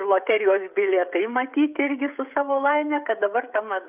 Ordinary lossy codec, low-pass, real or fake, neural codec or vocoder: AAC, 32 kbps; 3.6 kHz; fake; codec, 16 kHz, 16 kbps, FreqCodec, smaller model